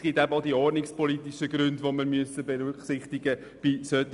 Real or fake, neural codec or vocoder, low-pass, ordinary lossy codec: real; none; 10.8 kHz; none